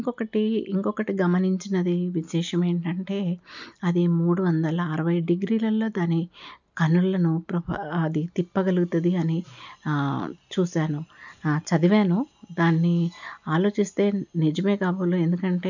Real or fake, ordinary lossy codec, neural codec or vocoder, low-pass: real; none; none; 7.2 kHz